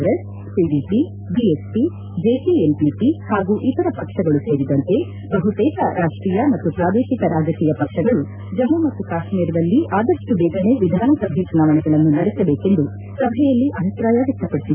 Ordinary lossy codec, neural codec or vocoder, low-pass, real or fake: none; none; 3.6 kHz; real